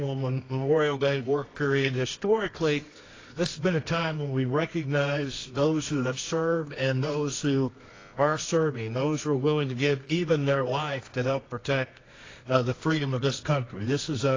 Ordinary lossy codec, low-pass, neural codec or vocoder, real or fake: AAC, 32 kbps; 7.2 kHz; codec, 24 kHz, 0.9 kbps, WavTokenizer, medium music audio release; fake